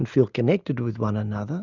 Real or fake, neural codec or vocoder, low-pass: real; none; 7.2 kHz